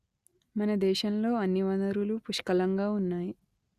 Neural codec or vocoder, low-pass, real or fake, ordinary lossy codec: none; 14.4 kHz; real; Opus, 64 kbps